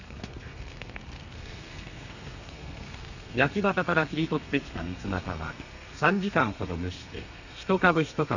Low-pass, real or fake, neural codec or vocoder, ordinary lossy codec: 7.2 kHz; fake; codec, 32 kHz, 1.9 kbps, SNAC; none